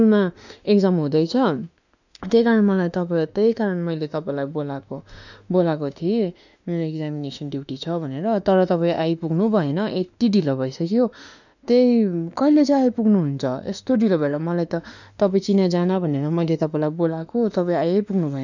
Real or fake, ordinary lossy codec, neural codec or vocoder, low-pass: fake; none; autoencoder, 48 kHz, 32 numbers a frame, DAC-VAE, trained on Japanese speech; 7.2 kHz